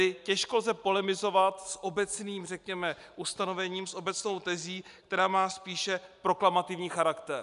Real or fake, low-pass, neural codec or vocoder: real; 10.8 kHz; none